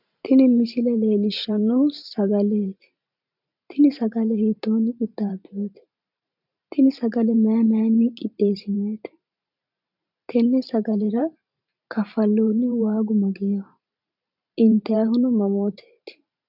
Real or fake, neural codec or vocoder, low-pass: fake; vocoder, 44.1 kHz, 128 mel bands every 512 samples, BigVGAN v2; 5.4 kHz